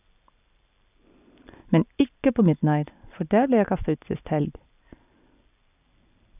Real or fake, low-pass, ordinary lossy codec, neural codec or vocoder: real; 3.6 kHz; none; none